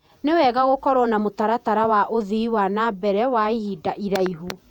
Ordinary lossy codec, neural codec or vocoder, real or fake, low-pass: none; vocoder, 48 kHz, 128 mel bands, Vocos; fake; 19.8 kHz